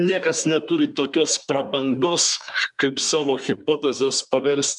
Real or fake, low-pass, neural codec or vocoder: fake; 10.8 kHz; codec, 24 kHz, 1 kbps, SNAC